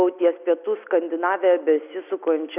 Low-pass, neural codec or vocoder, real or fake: 3.6 kHz; none; real